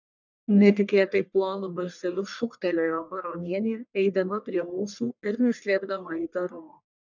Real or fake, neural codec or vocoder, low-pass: fake; codec, 44.1 kHz, 1.7 kbps, Pupu-Codec; 7.2 kHz